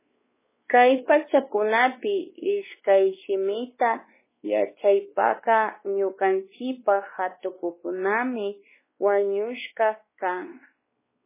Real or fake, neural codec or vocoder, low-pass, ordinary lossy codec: fake; codec, 16 kHz, 2 kbps, X-Codec, WavLM features, trained on Multilingual LibriSpeech; 3.6 kHz; MP3, 16 kbps